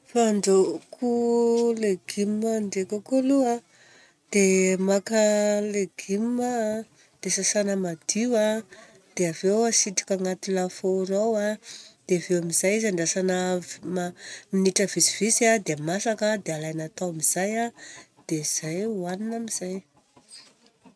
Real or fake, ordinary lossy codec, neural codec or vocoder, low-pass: real; none; none; none